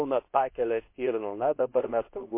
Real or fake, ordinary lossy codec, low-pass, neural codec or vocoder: fake; MP3, 24 kbps; 3.6 kHz; codec, 24 kHz, 0.9 kbps, WavTokenizer, medium speech release version 2